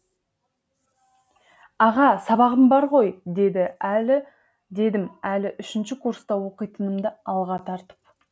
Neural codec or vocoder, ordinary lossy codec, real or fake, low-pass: none; none; real; none